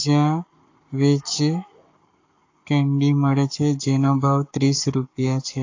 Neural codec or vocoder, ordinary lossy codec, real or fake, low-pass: codec, 44.1 kHz, 7.8 kbps, Pupu-Codec; AAC, 48 kbps; fake; 7.2 kHz